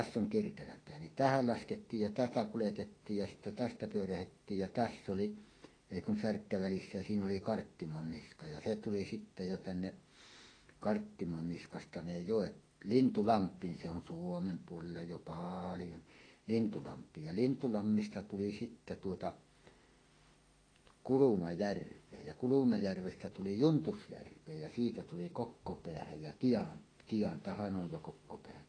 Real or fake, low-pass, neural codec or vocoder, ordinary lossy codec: fake; 9.9 kHz; autoencoder, 48 kHz, 32 numbers a frame, DAC-VAE, trained on Japanese speech; AAC, 32 kbps